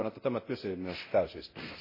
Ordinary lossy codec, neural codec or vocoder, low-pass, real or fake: MP3, 24 kbps; codec, 24 kHz, 0.9 kbps, DualCodec; 5.4 kHz; fake